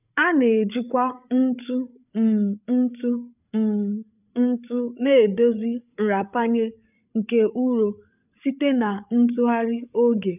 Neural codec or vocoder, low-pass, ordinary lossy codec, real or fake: codec, 16 kHz, 8 kbps, FreqCodec, larger model; 3.6 kHz; none; fake